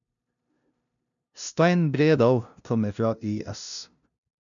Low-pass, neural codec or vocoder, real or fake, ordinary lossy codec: 7.2 kHz; codec, 16 kHz, 0.5 kbps, FunCodec, trained on LibriTTS, 25 frames a second; fake; none